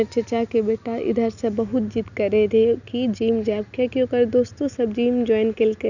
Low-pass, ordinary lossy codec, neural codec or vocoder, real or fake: 7.2 kHz; none; none; real